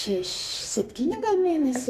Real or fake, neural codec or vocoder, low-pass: fake; codec, 32 kHz, 1.9 kbps, SNAC; 14.4 kHz